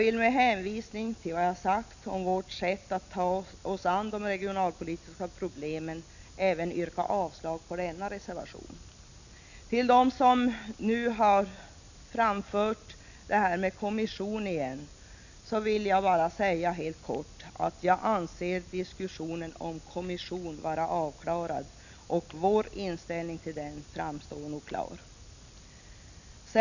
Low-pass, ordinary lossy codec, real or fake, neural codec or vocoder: 7.2 kHz; none; real; none